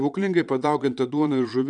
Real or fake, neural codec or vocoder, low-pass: fake; vocoder, 22.05 kHz, 80 mel bands, Vocos; 9.9 kHz